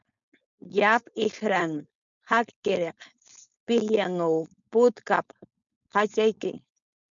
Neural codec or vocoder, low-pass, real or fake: codec, 16 kHz, 4.8 kbps, FACodec; 7.2 kHz; fake